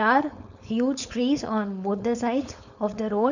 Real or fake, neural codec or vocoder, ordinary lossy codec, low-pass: fake; codec, 16 kHz, 4.8 kbps, FACodec; none; 7.2 kHz